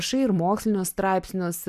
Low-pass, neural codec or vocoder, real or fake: 14.4 kHz; none; real